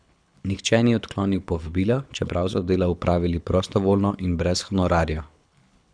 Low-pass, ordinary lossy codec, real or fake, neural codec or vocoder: 9.9 kHz; none; fake; codec, 24 kHz, 6 kbps, HILCodec